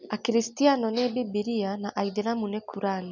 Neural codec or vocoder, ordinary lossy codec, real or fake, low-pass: none; none; real; 7.2 kHz